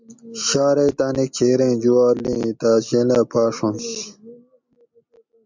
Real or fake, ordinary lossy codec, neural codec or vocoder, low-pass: real; MP3, 64 kbps; none; 7.2 kHz